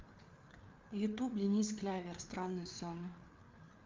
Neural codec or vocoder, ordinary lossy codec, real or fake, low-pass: codec, 16 kHz, 4 kbps, FreqCodec, larger model; Opus, 24 kbps; fake; 7.2 kHz